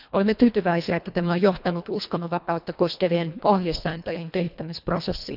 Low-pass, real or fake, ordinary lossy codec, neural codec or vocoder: 5.4 kHz; fake; none; codec, 24 kHz, 1.5 kbps, HILCodec